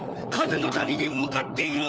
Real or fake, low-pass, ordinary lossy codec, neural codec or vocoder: fake; none; none; codec, 16 kHz, 4 kbps, FunCodec, trained on LibriTTS, 50 frames a second